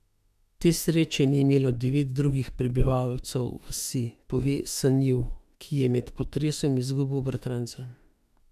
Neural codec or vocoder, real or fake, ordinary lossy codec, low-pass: autoencoder, 48 kHz, 32 numbers a frame, DAC-VAE, trained on Japanese speech; fake; none; 14.4 kHz